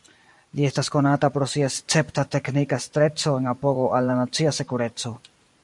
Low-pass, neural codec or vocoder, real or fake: 10.8 kHz; vocoder, 44.1 kHz, 128 mel bands every 512 samples, BigVGAN v2; fake